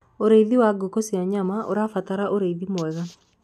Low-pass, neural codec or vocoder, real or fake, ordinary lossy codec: 14.4 kHz; none; real; none